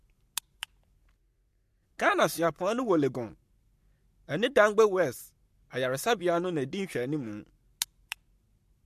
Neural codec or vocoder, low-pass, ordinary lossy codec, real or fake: codec, 44.1 kHz, 7.8 kbps, Pupu-Codec; 14.4 kHz; MP3, 64 kbps; fake